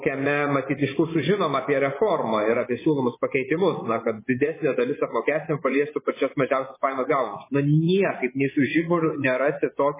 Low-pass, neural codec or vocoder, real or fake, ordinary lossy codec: 3.6 kHz; vocoder, 24 kHz, 100 mel bands, Vocos; fake; MP3, 16 kbps